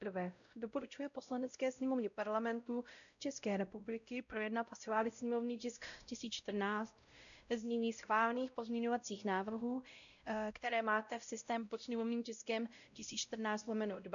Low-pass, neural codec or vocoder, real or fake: 7.2 kHz; codec, 16 kHz, 0.5 kbps, X-Codec, WavLM features, trained on Multilingual LibriSpeech; fake